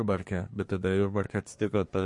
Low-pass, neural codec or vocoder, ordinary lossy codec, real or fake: 10.8 kHz; codec, 24 kHz, 1 kbps, SNAC; MP3, 48 kbps; fake